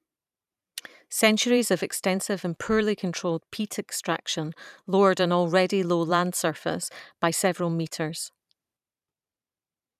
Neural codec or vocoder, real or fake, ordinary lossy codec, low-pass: vocoder, 44.1 kHz, 128 mel bands every 512 samples, BigVGAN v2; fake; none; 14.4 kHz